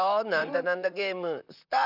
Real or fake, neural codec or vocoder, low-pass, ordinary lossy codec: fake; vocoder, 44.1 kHz, 128 mel bands, Pupu-Vocoder; 5.4 kHz; none